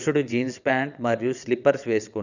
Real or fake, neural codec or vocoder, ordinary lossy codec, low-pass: fake; vocoder, 22.05 kHz, 80 mel bands, WaveNeXt; none; 7.2 kHz